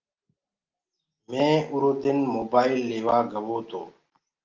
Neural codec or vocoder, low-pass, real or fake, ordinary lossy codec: none; 7.2 kHz; real; Opus, 32 kbps